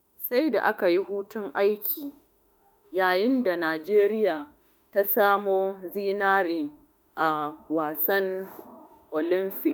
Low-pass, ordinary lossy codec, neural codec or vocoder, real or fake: none; none; autoencoder, 48 kHz, 32 numbers a frame, DAC-VAE, trained on Japanese speech; fake